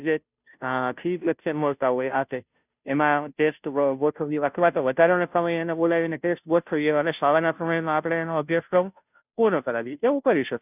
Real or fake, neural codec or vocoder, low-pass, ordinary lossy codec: fake; codec, 16 kHz, 0.5 kbps, FunCodec, trained on Chinese and English, 25 frames a second; 3.6 kHz; none